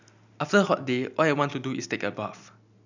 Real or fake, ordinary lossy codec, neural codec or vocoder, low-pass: real; none; none; 7.2 kHz